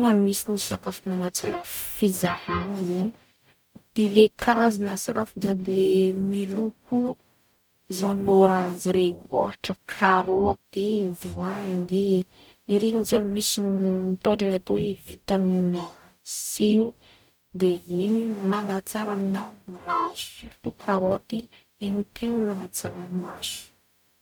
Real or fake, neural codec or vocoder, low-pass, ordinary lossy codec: fake; codec, 44.1 kHz, 0.9 kbps, DAC; none; none